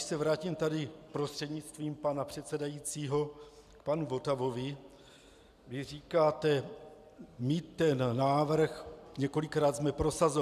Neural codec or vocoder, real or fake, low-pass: none; real; 14.4 kHz